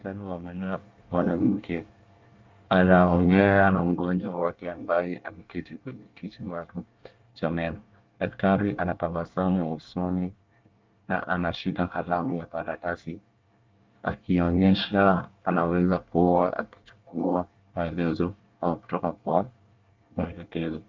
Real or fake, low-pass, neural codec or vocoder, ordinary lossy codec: fake; 7.2 kHz; codec, 24 kHz, 1 kbps, SNAC; Opus, 24 kbps